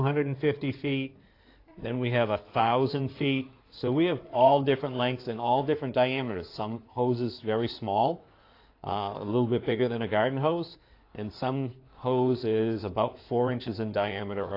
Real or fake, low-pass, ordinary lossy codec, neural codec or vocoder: fake; 5.4 kHz; AAC, 32 kbps; codec, 16 kHz in and 24 kHz out, 2.2 kbps, FireRedTTS-2 codec